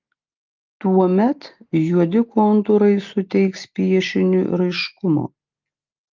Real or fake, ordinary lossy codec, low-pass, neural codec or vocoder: real; Opus, 24 kbps; 7.2 kHz; none